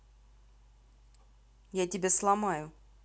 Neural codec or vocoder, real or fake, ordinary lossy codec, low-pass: none; real; none; none